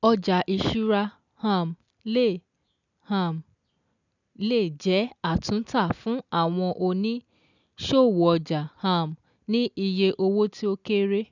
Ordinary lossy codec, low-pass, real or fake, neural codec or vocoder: none; 7.2 kHz; real; none